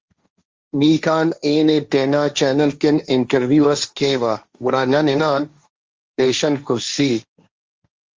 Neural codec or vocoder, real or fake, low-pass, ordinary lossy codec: codec, 16 kHz, 1.1 kbps, Voila-Tokenizer; fake; 7.2 kHz; Opus, 32 kbps